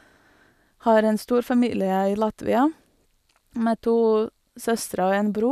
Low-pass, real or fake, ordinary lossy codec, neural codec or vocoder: 14.4 kHz; real; none; none